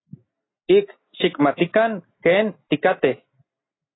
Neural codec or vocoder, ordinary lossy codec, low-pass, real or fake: none; AAC, 16 kbps; 7.2 kHz; real